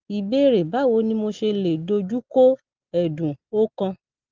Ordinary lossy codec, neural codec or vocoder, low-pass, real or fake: Opus, 32 kbps; none; 7.2 kHz; real